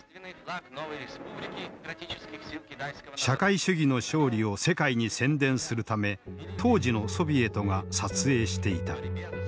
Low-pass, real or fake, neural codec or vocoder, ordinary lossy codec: none; real; none; none